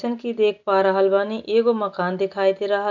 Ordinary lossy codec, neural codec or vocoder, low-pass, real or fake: none; none; 7.2 kHz; real